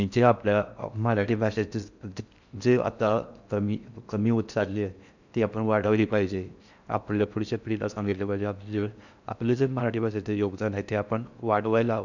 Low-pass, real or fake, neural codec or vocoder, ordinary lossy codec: 7.2 kHz; fake; codec, 16 kHz in and 24 kHz out, 0.6 kbps, FocalCodec, streaming, 4096 codes; none